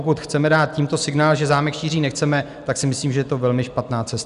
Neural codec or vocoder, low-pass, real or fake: none; 10.8 kHz; real